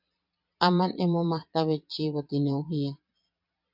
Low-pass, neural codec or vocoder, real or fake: 5.4 kHz; vocoder, 22.05 kHz, 80 mel bands, Vocos; fake